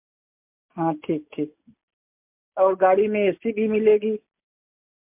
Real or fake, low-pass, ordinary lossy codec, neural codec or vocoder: real; 3.6 kHz; MP3, 32 kbps; none